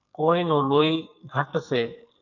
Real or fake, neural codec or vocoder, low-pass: fake; codec, 44.1 kHz, 2.6 kbps, SNAC; 7.2 kHz